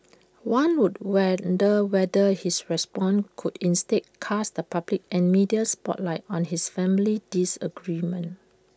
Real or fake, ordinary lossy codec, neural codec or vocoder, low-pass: real; none; none; none